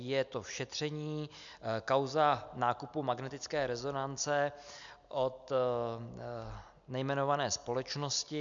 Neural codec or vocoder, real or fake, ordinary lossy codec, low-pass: none; real; MP3, 64 kbps; 7.2 kHz